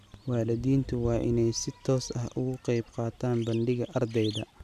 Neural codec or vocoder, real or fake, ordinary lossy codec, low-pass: none; real; none; 14.4 kHz